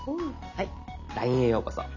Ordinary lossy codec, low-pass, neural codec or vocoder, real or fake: none; 7.2 kHz; none; real